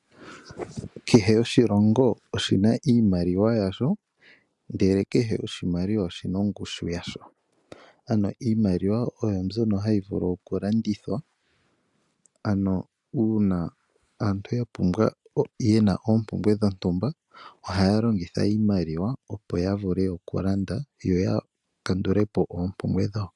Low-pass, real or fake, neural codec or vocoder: 10.8 kHz; real; none